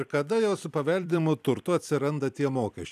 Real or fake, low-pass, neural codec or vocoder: fake; 14.4 kHz; vocoder, 44.1 kHz, 128 mel bands every 256 samples, BigVGAN v2